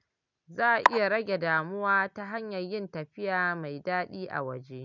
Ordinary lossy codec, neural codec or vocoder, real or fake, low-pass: none; none; real; 7.2 kHz